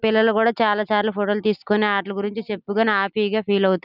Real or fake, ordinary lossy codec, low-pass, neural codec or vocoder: real; none; 5.4 kHz; none